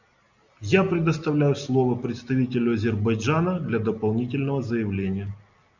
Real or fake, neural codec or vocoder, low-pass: real; none; 7.2 kHz